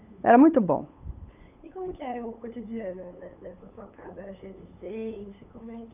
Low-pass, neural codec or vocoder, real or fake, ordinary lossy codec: 3.6 kHz; codec, 16 kHz, 8 kbps, FunCodec, trained on LibriTTS, 25 frames a second; fake; none